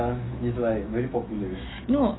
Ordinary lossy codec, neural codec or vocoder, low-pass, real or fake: AAC, 16 kbps; autoencoder, 48 kHz, 128 numbers a frame, DAC-VAE, trained on Japanese speech; 7.2 kHz; fake